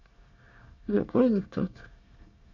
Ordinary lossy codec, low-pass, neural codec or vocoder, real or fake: none; 7.2 kHz; codec, 24 kHz, 1 kbps, SNAC; fake